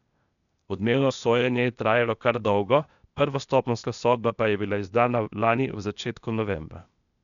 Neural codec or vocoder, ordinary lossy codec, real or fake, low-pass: codec, 16 kHz, 0.8 kbps, ZipCodec; none; fake; 7.2 kHz